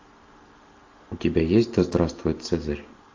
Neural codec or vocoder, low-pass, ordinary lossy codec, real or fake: none; 7.2 kHz; AAC, 48 kbps; real